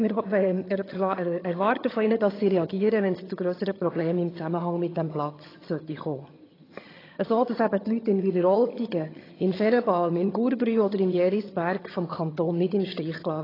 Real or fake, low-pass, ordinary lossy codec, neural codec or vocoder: fake; 5.4 kHz; AAC, 24 kbps; vocoder, 22.05 kHz, 80 mel bands, HiFi-GAN